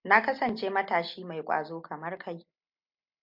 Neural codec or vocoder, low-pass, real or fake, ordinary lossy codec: none; 5.4 kHz; real; MP3, 48 kbps